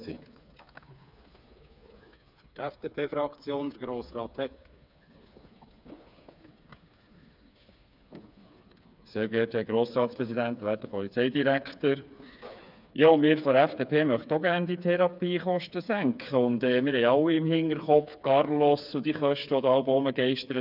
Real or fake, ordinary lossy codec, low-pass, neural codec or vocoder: fake; none; 5.4 kHz; codec, 16 kHz, 4 kbps, FreqCodec, smaller model